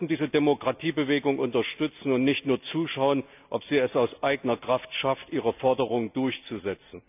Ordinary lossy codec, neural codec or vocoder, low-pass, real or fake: none; none; 3.6 kHz; real